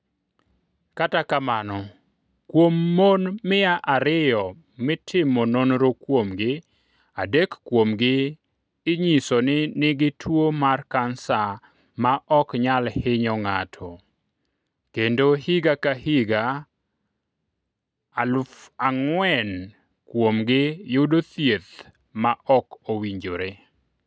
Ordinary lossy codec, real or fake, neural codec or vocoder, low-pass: none; real; none; none